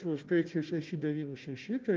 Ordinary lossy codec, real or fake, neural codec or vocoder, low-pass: Opus, 32 kbps; fake; codec, 16 kHz, 1 kbps, FunCodec, trained on Chinese and English, 50 frames a second; 7.2 kHz